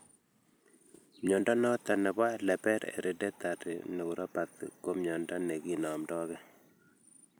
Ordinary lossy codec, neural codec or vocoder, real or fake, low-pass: none; vocoder, 44.1 kHz, 128 mel bands every 256 samples, BigVGAN v2; fake; none